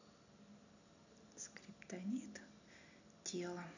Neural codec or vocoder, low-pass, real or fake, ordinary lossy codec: none; 7.2 kHz; real; none